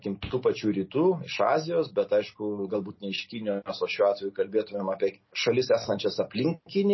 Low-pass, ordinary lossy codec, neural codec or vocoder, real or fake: 7.2 kHz; MP3, 24 kbps; none; real